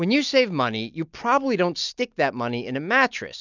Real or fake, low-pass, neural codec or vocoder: real; 7.2 kHz; none